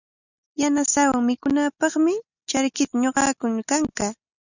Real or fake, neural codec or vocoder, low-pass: real; none; 7.2 kHz